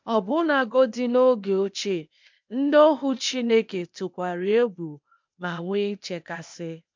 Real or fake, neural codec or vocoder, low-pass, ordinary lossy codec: fake; codec, 16 kHz, 0.8 kbps, ZipCodec; 7.2 kHz; MP3, 64 kbps